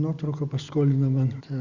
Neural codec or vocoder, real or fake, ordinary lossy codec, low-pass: none; real; Opus, 64 kbps; 7.2 kHz